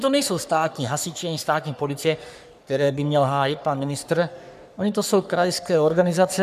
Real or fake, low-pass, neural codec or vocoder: fake; 14.4 kHz; codec, 44.1 kHz, 3.4 kbps, Pupu-Codec